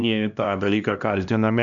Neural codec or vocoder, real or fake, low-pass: codec, 16 kHz, 1 kbps, X-Codec, HuBERT features, trained on LibriSpeech; fake; 7.2 kHz